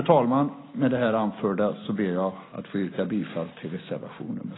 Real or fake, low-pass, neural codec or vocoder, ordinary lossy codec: real; 7.2 kHz; none; AAC, 16 kbps